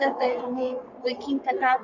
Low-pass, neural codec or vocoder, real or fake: 7.2 kHz; codec, 44.1 kHz, 3.4 kbps, Pupu-Codec; fake